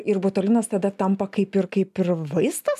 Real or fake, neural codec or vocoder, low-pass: real; none; 14.4 kHz